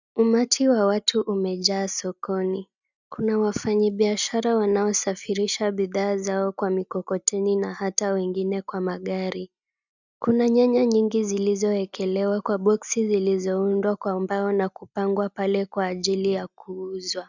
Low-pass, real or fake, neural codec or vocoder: 7.2 kHz; real; none